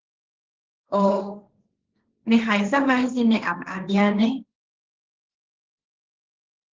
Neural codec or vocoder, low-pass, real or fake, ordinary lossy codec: codec, 16 kHz, 1.1 kbps, Voila-Tokenizer; 7.2 kHz; fake; Opus, 16 kbps